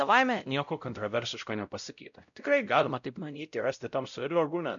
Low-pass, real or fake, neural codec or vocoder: 7.2 kHz; fake; codec, 16 kHz, 0.5 kbps, X-Codec, WavLM features, trained on Multilingual LibriSpeech